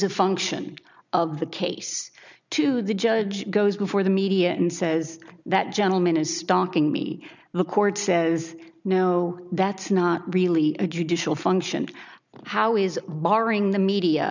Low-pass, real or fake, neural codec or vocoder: 7.2 kHz; real; none